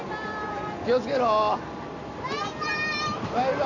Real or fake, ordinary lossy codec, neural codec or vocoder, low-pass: real; none; none; 7.2 kHz